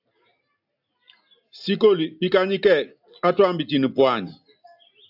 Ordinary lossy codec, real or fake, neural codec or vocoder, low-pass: AAC, 48 kbps; real; none; 5.4 kHz